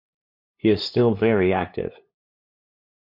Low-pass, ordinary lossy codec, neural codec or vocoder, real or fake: 5.4 kHz; MP3, 48 kbps; codec, 16 kHz, 8 kbps, FunCodec, trained on LibriTTS, 25 frames a second; fake